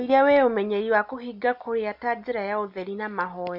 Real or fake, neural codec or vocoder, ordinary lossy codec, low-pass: real; none; none; 5.4 kHz